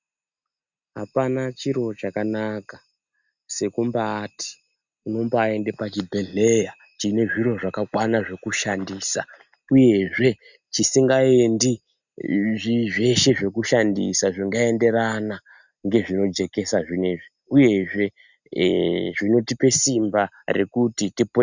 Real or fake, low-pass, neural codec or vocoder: real; 7.2 kHz; none